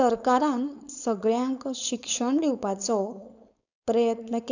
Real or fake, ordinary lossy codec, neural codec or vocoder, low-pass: fake; none; codec, 16 kHz, 4.8 kbps, FACodec; 7.2 kHz